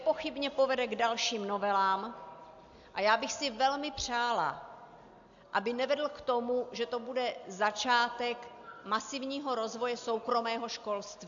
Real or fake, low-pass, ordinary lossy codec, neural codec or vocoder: real; 7.2 kHz; AAC, 64 kbps; none